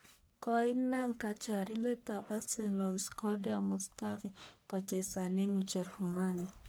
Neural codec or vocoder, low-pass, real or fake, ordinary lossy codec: codec, 44.1 kHz, 1.7 kbps, Pupu-Codec; none; fake; none